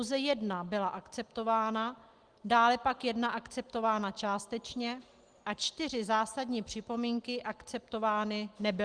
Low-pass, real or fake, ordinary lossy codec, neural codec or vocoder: 9.9 kHz; real; Opus, 24 kbps; none